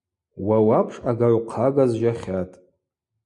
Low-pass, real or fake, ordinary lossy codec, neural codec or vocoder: 10.8 kHz; real; MP3, 48 kbps; none